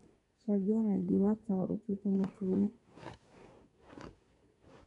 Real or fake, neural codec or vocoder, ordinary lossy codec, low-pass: fake; codec, 24 kHz, 1 kbps, SNAC; none; 10.8 kHz